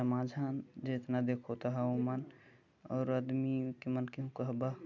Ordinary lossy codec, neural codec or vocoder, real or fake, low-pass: none; none; real; 7.2 kHz